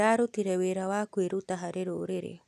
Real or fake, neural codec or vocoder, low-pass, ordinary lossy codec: real; none; 14.4 kHz; none